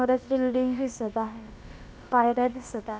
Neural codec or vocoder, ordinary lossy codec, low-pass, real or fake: codec, 16 kHz, about 1 kbps, DyCAST, with the encoder's durations; none; none; fake